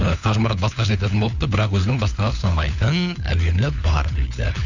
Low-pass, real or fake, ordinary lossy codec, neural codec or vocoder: 7.2 kHz; fake; none; codec, 16 kHz, 4 kbps, FunCodec, trained on LibriTTS, 50 frames a second